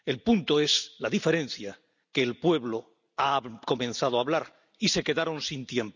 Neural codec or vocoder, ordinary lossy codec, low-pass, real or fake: none; none; 7.2 kHz; real